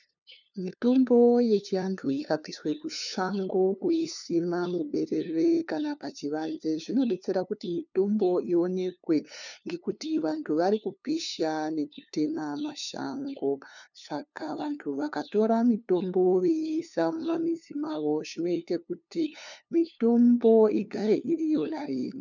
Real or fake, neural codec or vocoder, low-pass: fake; codec, 16 kHz, 2 kbps, FunCodec, trained on LibriTTS, 25 frames a second; 7.2 kHz